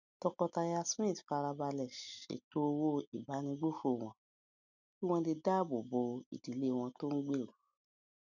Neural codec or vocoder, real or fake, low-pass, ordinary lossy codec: none; real; 7.2 kHz; none